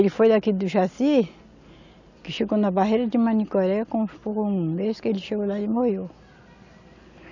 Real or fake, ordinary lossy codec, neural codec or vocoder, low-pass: real; none; none; 7.2 kHz